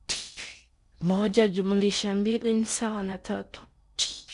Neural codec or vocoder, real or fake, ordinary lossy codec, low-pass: codec, 16 kHz in and 24 kHz out, 0.6 kbps, FocalCodec, streaming, 4096 codes; fake; none; 10.8 kHz